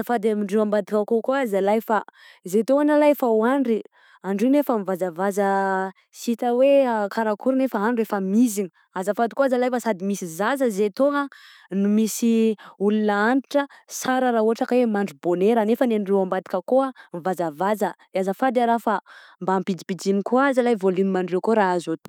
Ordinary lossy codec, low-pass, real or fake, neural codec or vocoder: none; 19.8 kHz; real; none